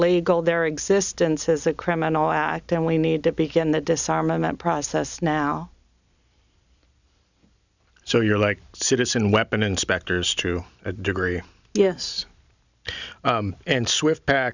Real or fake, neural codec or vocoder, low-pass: real; none; 7.2 kHz